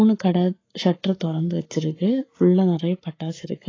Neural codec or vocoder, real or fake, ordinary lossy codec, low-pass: codec, 44.1 kHz, 7.8 kbps, Pupu-Codec; fake; AAC, 32 kbps; 7.2 kHz